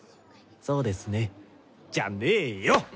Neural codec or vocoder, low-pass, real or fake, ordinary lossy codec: none; none; real; none